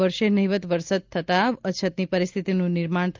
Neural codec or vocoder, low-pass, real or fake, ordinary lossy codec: none; 7.2 kHz; real; Opus, 32 kbps